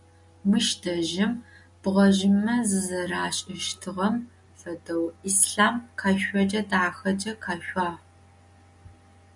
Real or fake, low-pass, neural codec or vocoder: real; 10.8 kHz; none